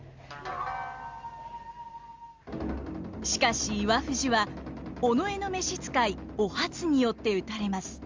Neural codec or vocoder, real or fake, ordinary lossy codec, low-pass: none; real; Opus, 32 kbps; 7.2 kHz